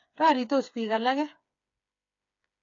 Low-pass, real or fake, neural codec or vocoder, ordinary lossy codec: 7.2 kHz; fake; codec, 16 kHz, 4 kbps, FreqCodec, smaller model; AAC, 48 kbps